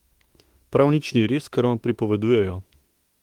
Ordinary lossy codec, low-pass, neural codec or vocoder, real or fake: Opus, 24 kbps; 19.8 kHz; autoencoder, 48 kHz, 32 numbers a frame, DAC-VAE, trained on Japanese speech; fake